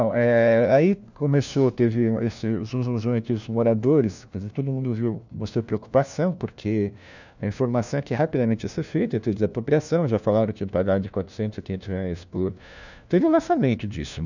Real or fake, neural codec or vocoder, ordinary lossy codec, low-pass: fake; codec, 16 kHz, 1 kbps, FunCodec, trained on LibriTTS, 50 frames a second; none; 7.2 kHz